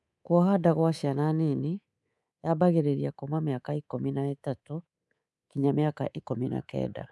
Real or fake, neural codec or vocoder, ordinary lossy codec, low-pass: fake; codec, 24 kHz, 3.1 kbps, DualCodec; none; none